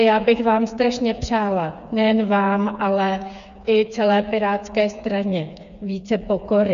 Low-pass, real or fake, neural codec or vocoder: 7.2 kHz; fake; codec, 16 kHz, 4 kbps, FreqCodec, smaller model